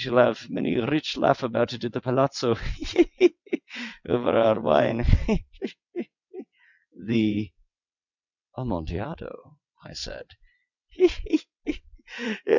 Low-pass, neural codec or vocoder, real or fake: 7.2 kHz; vocoder, 22.05 kHz, 80 mel bands, WaveNeXt; fake